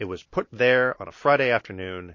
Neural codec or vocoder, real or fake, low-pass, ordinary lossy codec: none; real; 7.2 kHz; MP3, 32 kbps